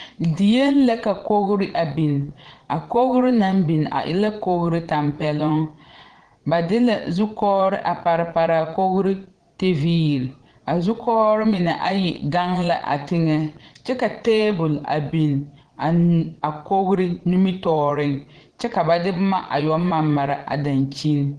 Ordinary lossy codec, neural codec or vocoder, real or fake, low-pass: Opus, 16 kbps; vocoder, 22.05 kHz, 80 mel bands, Vocos; fake; 9.9 kHz